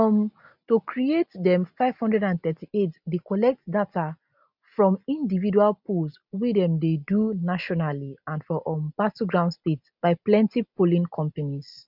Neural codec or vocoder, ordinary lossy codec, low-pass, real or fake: none; none; 5.4 kHz; real